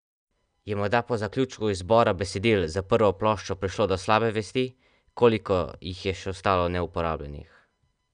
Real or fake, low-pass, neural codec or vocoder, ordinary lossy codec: real; 9.9 kHz; none; none